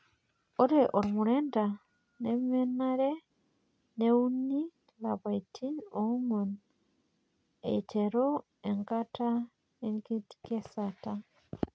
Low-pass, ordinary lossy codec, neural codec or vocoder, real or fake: none; none; none; real